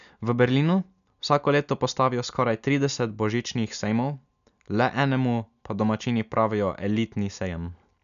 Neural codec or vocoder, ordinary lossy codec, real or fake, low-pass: none; none; real; 7.2 kHz